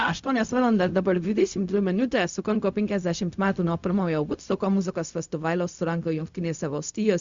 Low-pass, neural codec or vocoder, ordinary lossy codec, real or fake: 7.2 kHz; codec, 16 kHz, 0.4 kbps, LongCat-Audio-Codec; Opus, 64 kbps; fake